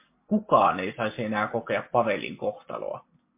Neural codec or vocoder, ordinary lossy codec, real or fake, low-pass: none; MP3, 24 kbps; real; 3.6 kHz